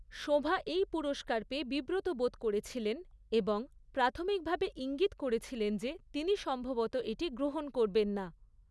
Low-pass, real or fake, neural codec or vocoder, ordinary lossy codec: none; real; none; none